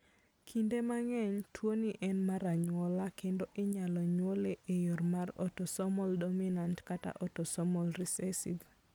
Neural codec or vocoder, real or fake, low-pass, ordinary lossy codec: none; real; none; none